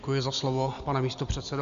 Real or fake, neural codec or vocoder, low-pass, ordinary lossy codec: real; none; 7.2 kHz; Opus, 64 kbps